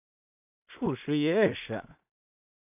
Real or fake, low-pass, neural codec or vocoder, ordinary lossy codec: fake; 3.6 kHz; codec, 16 kHz in and 24 kHz out, 0.4 kbps, LongCat-Audio-Codec, two codebook decoder; AAC, 32 kbps